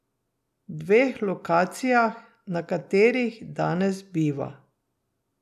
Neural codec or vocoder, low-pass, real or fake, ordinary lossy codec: none; 14.4 kHz; real; none